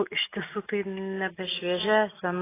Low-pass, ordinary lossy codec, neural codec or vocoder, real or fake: 3.6 kHz; AAC, 16 kbps; none; real